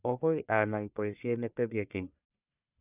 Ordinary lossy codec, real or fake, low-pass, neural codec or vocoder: none; fake; 3.6 kHz; codec, 44.1 kHz, 1.7 kbps, Pupu-Codec